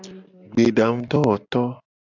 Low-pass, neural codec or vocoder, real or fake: 7.2 kHz; none; real